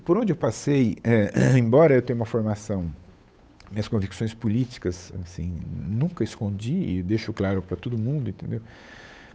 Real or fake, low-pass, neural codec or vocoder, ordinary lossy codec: fake; none; codec, 16 kHz, 8 kbps, FunCodec, trained on Chinese and English, 25 frames a second; none